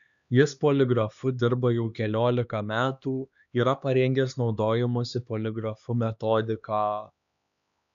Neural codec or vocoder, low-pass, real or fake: codec, 16 kHz, 2 kbps, X-Codec, HuBERT features, trained on LibriSpeech; 7.2 kHz; fake